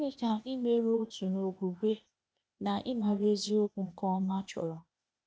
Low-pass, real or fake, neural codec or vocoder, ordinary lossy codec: none; fake; codec, 16 kHz, 0.8 kbps, ZipCodec; none